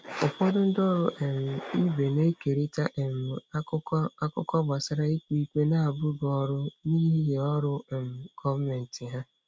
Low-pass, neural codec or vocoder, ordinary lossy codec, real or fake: none; none; none; real